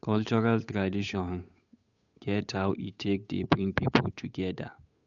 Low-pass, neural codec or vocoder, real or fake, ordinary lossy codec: 7.2 kHz; codec, 16 kHz, 8 kbps, FunCodec, trained on Chinese and English, 25 frames a second; fake; none